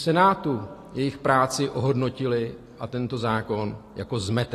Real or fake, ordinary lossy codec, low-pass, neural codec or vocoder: real; AAC, 48 kbps; 14.4 kHz; none